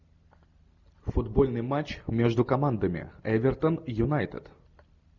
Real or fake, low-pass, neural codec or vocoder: real; 7.2 kHz; none